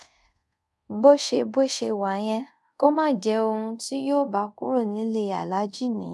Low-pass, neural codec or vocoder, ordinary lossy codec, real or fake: none; codec, 24 kHz, 0.5 kbps, DualCodec; none; fake